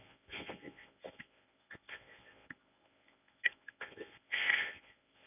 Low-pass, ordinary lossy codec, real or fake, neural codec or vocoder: 3.6 kHz; none; fake; codec, 24 kHz, 0.9 kbps, WavTokenizer, medium speech release version 1